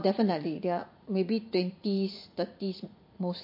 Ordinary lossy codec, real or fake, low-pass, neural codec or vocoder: MP3, 32 kbps; fake; 5.4 kHz; vocoder, 44.1 kHz, 80 mel bands, Vocos